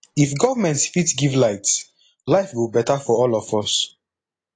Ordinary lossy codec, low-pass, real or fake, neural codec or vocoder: AAC, 32 kbps; 9.9 kHz; real; none